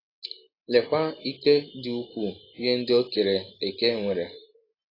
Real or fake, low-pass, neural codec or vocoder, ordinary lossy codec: real; 5.4 kHz; none; AAC, 24 kbps